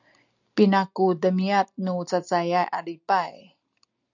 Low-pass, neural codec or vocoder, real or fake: 7.2 kHz; none; real